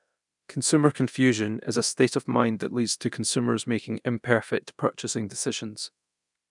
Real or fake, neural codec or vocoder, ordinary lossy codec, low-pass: fake; codec, 24 kHz, 0.9 kbps, DualCodec; none; 10.8 kHz